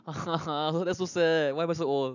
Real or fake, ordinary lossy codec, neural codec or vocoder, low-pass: real; none; none; 7.2 kHz